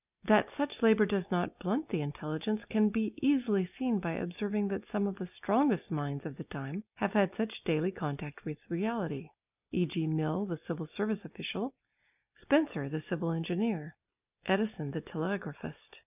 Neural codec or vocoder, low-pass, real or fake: none; 3.6 kHz; real